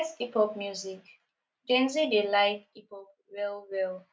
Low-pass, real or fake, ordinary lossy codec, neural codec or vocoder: none; real; none; none